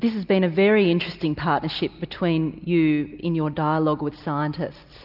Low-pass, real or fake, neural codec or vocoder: 5.4 kHz; real; none